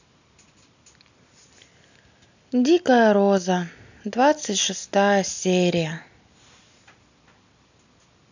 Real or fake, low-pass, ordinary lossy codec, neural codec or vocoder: real; 7.2 kHz; none; none